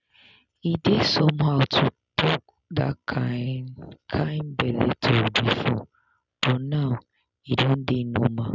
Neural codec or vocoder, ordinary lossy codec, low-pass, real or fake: none; none; 7.2 kHz; real